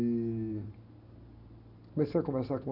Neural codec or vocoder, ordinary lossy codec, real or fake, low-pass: none; none; real; 5.4 kHz